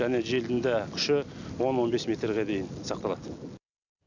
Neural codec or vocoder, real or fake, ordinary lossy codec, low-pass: none; real; none; 7.2 kHz